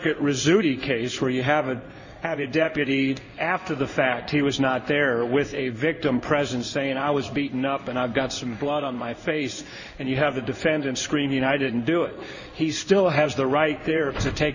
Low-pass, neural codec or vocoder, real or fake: 7.2 kHz; codec, 16 kHz in and 24 kHz out, 1 kbps, XY-Tokenizer; fake